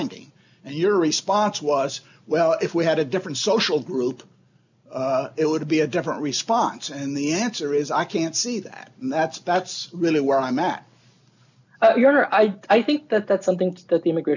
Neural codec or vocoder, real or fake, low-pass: none; real; 7.2 kHz